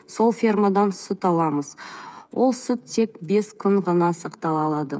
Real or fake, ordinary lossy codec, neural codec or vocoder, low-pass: fake; none; codec, 16 kHz, 8 kbps, FreqCodec, smaller model; none